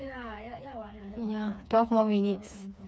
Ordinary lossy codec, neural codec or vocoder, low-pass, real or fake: none; codec, 16 kHz, 4 kbps, FreqCodec, smaller model; none; fake